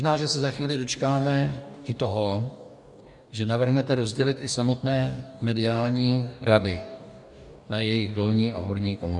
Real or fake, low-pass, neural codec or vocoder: fake; 10.8 kHz; codec, 44.1 kHz, 2.6 kbps, DAC